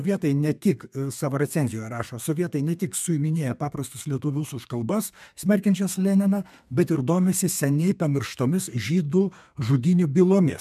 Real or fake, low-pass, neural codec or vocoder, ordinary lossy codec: fake; 14.4 kHz; codec, 32 kHz, 1.9 kbps, SNAC; MP3, 96 kbps